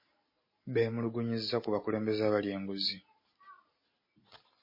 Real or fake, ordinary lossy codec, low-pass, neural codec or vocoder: real; MP3, 24 kbps; 5.4 kHz; none